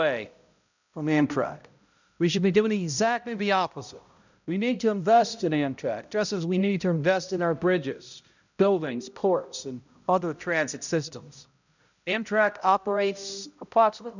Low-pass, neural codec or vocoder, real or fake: 7.2 kHz; codec, 16 kHz, 0.5 kbps, X-Codec, HuBERT features, trained on balanced general audio; fake